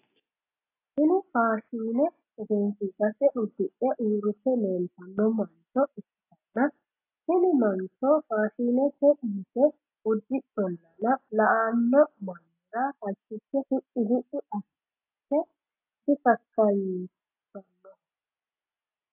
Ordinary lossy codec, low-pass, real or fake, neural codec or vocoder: AAC, 32 kbps; 3.6 kHz; real; none